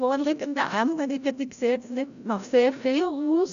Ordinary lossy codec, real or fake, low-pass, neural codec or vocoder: none; fake; 7.2 kHz; codec, 16 kHz, 0.5 kbps, FreqCodec, larger model